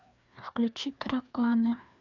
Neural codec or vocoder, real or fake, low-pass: codec, 16 kHz, 2 kbps, FreqCodec, larger model; fake; 7.2 kHz